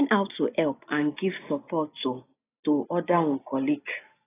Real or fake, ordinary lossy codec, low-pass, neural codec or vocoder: real; AAC, 16 kbps; 3.6 kHz; none